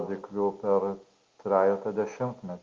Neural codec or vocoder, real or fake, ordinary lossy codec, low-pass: none; real; Opus, 32 kbps; 7.2 kHz